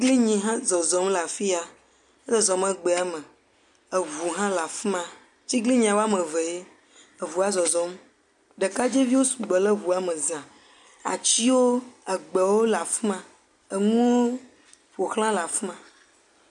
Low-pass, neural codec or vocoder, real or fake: 10.8 kHz; none; real